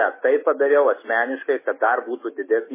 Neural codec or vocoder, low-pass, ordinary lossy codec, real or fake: autoencoder, 48 kHz, 128 numbers a frame, DAC-VAE, trained on Japanese speech; 3.6 kHz; MP3, 16 kbps; fake